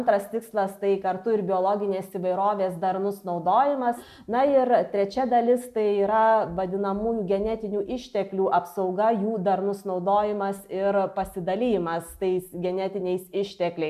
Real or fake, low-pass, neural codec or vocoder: real; 14.4 kHz; none